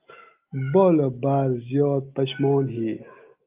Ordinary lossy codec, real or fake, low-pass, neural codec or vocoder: Opus, 24 kbps; real; 3.6 kHz; none